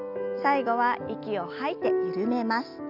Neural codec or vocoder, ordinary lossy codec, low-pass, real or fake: none; none; 5.4 kHz; real